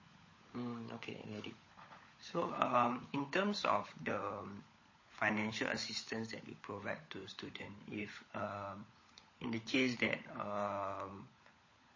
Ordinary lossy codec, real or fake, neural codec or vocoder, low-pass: MP3, 32 kbps; fake; codec, 16 kHz, 16 kbps, FunCodec, trained on LibriTTS, 50 frames a second; 7.2 kHz